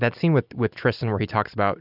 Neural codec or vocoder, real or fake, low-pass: none; real; 5.4 kHz